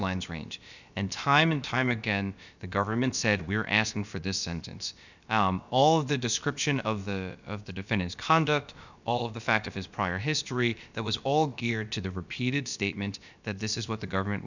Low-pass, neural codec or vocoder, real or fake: 7.2 kHz; codec, 16 kHz, about 1 kbps, DyCAST, with the encoder's durations; fake